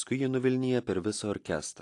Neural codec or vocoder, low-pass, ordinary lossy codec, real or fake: none; 10.8 kHz; AAC, 48 kbps; real